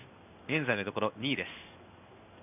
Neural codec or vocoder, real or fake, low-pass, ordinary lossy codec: codec, 16 kHz in and 24 kHz out, 1 kbps, XY-Tokenizer; fake; 3.6 kHz; none